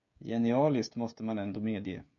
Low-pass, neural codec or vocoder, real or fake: 7.2 kHz; codec, 16 kHz, 16 kbps, FreqCodec, smaller model; fake